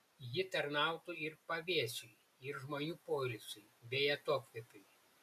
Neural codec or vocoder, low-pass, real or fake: none; 14.4 kHz; real